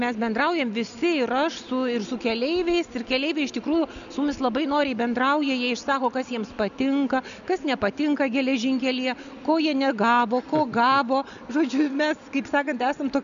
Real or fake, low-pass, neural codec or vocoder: real; 7.2 kHz; none